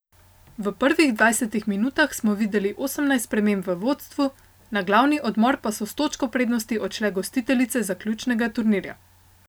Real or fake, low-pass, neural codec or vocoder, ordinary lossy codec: real; none; none; none